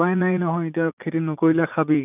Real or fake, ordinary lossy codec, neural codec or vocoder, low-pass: fake; none; vocoder, 44.1 kHz, 80 mel bands, Vocos; 3.6 kHz